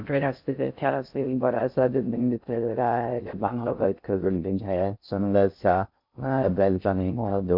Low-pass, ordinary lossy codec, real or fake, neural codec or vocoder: 5.4 kHz; MP3, 48 kbps; fake; codec, 16 kHz in and 24 kHz out, 0.6 kbps, FocalCodec, streaming, 2048 codes